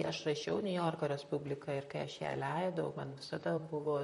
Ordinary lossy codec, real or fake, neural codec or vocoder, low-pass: MP3, 48 kbps; fake; vocoder, 44.1 kHz, 128 mel bands, Pupu-Vocoder; 19.8 kHz